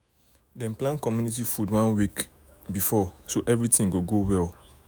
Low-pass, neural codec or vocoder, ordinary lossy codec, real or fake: none; autoencoder, 48 kHz, 128 numbers a frame, DAC-VAE, trained on Japanese speech; none; fake